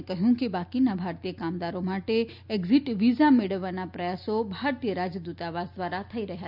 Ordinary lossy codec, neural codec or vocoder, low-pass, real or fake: none; none; 5.4 kHz; real